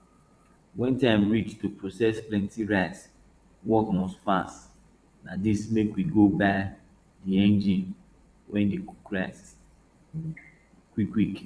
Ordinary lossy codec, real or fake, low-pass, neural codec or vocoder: none; fake; none; vocoder, 22.05 kHz, 80 mel bands, WaveNeXt